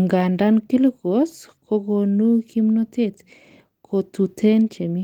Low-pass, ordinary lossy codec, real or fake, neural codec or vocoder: 19.8 kHz; Opus, 24 kbps; real; none